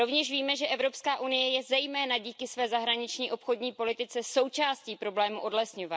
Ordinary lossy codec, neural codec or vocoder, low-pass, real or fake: none; none; none; real